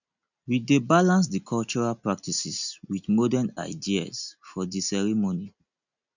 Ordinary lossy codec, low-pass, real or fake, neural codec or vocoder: none; 7.2 kHz; real; none